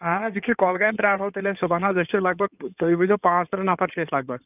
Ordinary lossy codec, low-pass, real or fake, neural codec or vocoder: none; 3.6 kHz; fake; codec, 16 kHz in and 24 kHz out, 2.2 kbps, FireRedTTS-2 codec